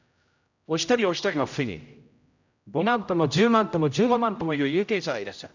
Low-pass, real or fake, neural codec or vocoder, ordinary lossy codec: 7.2 kHz; fake; codec, 16 kHz, 0.5 kbps, X-Codec, HuBERT features, trained on general audio; none